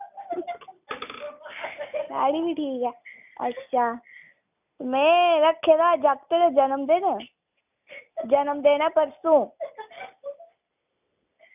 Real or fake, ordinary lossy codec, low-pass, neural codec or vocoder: real; none; 3.6 kHz; none